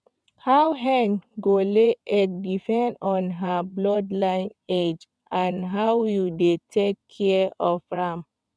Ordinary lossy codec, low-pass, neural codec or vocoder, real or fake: none; none; vocoder, 22.05 kHz, 80 mel bands, WaveNeXt; fake